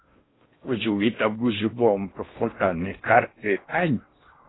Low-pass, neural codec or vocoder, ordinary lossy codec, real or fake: 7.2 kHz; codec, 16 kHz in and 24 kHz out, 0.6 kbps, FocalCodec, streaming, 4096 codes; AAC, 16 kbps; fake